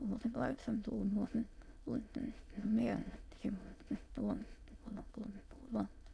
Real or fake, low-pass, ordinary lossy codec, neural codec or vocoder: fake; none; none; autoencoder, 22.05 kHz, a latent of 192 numbers a frame, VITS, trained on many speakers